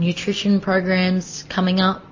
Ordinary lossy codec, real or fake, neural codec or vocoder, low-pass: MP3, 32 kbps; real; none; 7.2 kHz